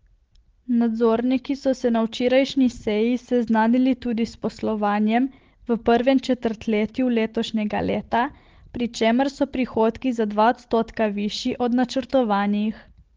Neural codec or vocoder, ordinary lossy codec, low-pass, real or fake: none; Opus, 16 kbps; 7.2 kHz; real